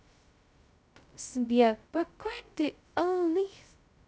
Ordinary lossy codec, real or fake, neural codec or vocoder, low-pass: none; fake; codec, 16 kHz, 0.2 kbps, FocalCodec; none